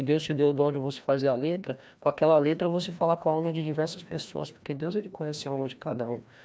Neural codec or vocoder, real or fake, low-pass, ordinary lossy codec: codec, 16 kHz, 1 kbps, FreqCodec, larger model; fake; none; none